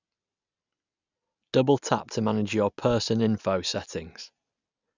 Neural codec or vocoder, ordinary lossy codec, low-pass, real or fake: none; none; 7.2 kHz; real